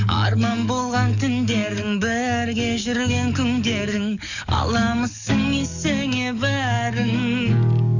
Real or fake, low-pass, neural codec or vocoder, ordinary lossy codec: fake; 7.2 kHz; codec, 44.1 kHz, 7.8 kbps, DAC; none